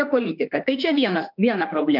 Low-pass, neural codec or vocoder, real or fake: 5.4 kHz; autoencoder, 48 kHz, 32 numbers a frame, DAC-VAE, trained on Japanese speech; fake